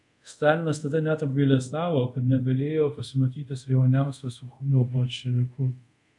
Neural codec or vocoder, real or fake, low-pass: codec, 24 kHz, 0.5 kbps, DualCodec; fake; 10.8 kHz